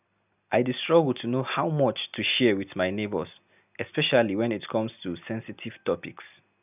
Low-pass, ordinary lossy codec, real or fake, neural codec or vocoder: 3.6 kHz; none; real; none